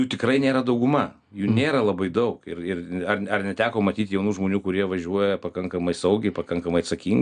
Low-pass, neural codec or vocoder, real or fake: 9.9 kHz; none; real